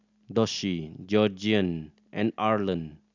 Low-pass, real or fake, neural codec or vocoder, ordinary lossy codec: 7.2 kHz; real; none; none